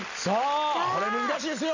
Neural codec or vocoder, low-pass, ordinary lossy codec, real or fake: none; 7.2 kHz; AAC, 48 kbps; real